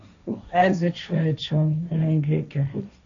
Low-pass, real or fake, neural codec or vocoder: 7.2 kHz; fake; codec, 16 kHz, 1.1 kbps, Voila-Tokenizer